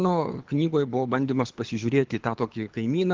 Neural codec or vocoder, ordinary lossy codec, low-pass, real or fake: codec, 24 kHz, 6 kbps, HILCodec; Opus, 16 kbps; 7.2 kHz; fake